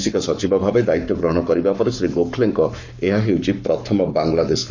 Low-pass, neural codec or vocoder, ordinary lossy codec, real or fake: 7.2 kHz; codec, 44.1 kHz, 7.8 kbps, Pupu-Codec; none; fake